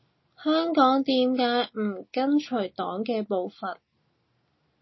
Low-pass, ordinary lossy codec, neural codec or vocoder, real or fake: 7.2 kHz; MP3, 24 kbps; none; real